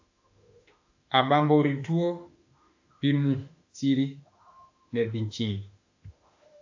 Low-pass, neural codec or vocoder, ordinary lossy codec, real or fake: 7.2 kHz; autoencoder, 48 kHz, 32 numbers a frame, DAC-VAE, trained on Japanese speech; AAC, 48 kbps; fake